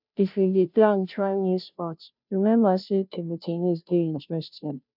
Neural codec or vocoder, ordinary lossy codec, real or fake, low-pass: codec, 16 kHz, 0.5 kbps, FunCodec, trained on Chinese and English, 25 frames a second; none; fake; 5.4 kHz